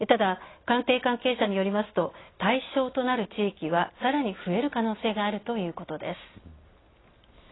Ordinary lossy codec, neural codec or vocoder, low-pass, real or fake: AAC, 16 kbps; none; 7.2 kHz; real